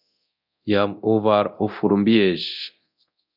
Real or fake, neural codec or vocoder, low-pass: fake; codec, 24 kHz, 0.9 kbps, DualCodec; 5.4 kHz